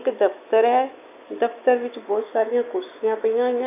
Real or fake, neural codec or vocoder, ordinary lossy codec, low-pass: fake; autoencoder, 48 kHz, 128 numbers a frame, DAC-VAE, trained on Japanese speech; none; 3.6 kHz